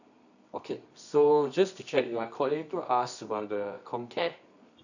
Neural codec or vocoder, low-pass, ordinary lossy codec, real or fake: codec, 24 kHz, 0.9 kbps, WavTokenizer, medium music audio release; 7.2 kHz; none; fake